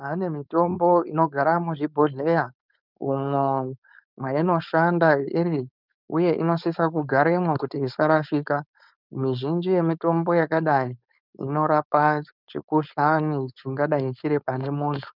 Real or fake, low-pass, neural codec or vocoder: fake; 5.4 kHz; codec, 16 kHz, 4.8 kbps, FACodec